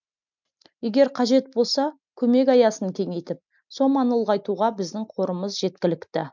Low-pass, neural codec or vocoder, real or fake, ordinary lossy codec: 7.2 kHz; none; real; none